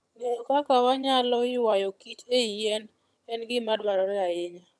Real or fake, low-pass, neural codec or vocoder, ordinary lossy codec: fake; none; vocoder, 22.05 kHz, 80 mel bands, HiFi-GAN; none